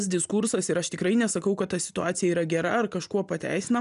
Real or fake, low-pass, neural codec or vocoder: real; 10.8 kHz; none